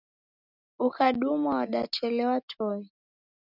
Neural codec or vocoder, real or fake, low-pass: none; real; 5.4 kHz